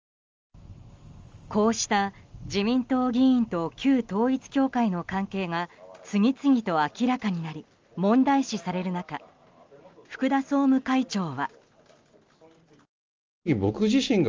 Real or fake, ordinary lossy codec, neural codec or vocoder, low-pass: real; Opus, 32 kbps; none; 7.2 kHz